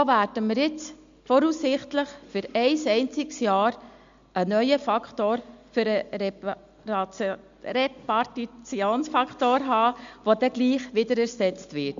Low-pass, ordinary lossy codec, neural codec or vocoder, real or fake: 7.2 kHz; MP3, 64 kbps; none; real